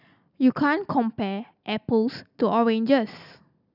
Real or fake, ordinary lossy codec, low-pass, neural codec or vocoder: real; none; 5.4 kHz; none